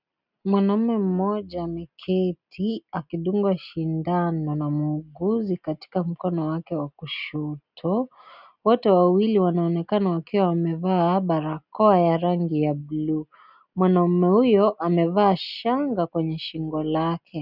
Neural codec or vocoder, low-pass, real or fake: none; 5.4 kHz; real